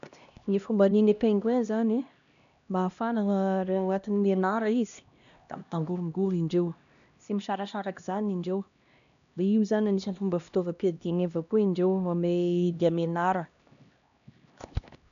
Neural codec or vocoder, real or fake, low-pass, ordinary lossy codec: codec, 16 kHz, 1 kbps, X-Codec, HuBERT features, trained on LibriSpeech; fake; 7.2 kHz; none